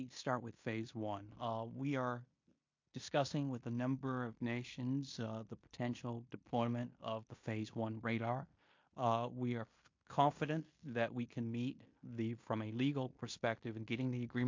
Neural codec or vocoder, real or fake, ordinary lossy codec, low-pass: codec, 16 kHz in and 24 kHz out, 0.9 kbps, LongCat-Audio-Codec, fine tuned four codebook decoder; fake; MP3, 48 kbps; 7.2 kHz